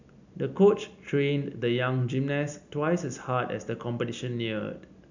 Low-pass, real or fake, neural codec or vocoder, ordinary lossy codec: 7.2 kHz; real; none; none